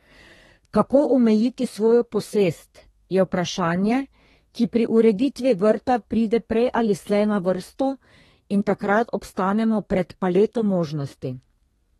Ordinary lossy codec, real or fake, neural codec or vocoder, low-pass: AAC, 32 kbps; fake; codec, 32 kHz, 1.9 kbps, SNAC; 14.4 kHz